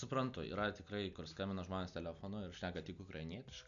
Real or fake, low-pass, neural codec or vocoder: real; 7.2 kHz; none